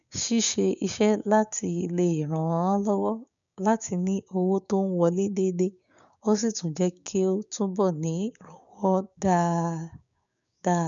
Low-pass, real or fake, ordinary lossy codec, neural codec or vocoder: 7.2 kHz; fake; none; codec, 16 kHz, 6 kbps, DAC